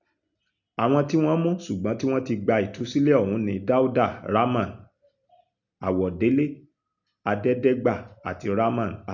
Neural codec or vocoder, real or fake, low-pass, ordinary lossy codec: none; real; 7.2 kHz; none